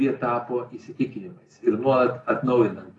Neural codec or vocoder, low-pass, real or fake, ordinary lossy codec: none; 10.8 kHz; real; AAC, 32 kbps